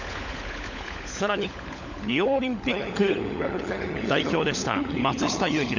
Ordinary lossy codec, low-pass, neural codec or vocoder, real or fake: none; 7.2 kHz; codec, 16 kHz, 16 kbps, FunCodec, trained on LibriTTS, 50 frames a second; fake